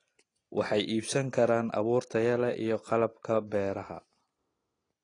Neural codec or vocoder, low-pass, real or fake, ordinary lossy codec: none; 9.9 kHz; real; AAC, 32 kbps